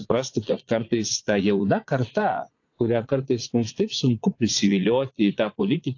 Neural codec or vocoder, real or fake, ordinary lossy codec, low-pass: vocoder, 22.05 kHz, 80 mel bands, Vocos; fake; AAC, 48 kbps; 7.2 kHz